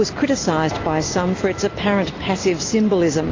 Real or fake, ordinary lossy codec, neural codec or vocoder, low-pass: real; AAC, 32 kbps; none; 7.2 kHz